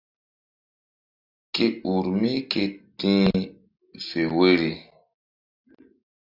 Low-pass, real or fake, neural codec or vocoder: 5.4 kHz; real; none